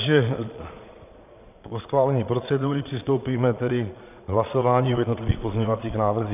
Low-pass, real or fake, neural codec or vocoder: 3.6 kHz; fake; vocoder, 22.05 kHz, 80 mel bands, Vocos